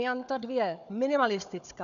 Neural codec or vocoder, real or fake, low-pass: codec, 16 kHz, 4 kbps, FunCodec, trained on Chinese and English, 50 frames a second; fake; 7.2 kHz